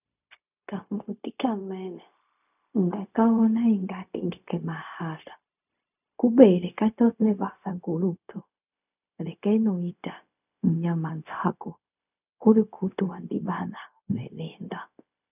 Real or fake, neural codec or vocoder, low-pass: fake; codec, 16 kHz, 0.4 kbps, LongCat-Audio-Codec; 3.6 kHz